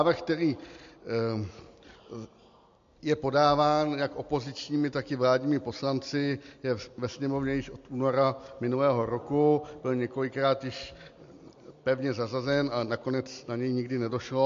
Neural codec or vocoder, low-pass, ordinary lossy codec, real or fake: none; 7.2 kHz; MP3, 48 kbps; real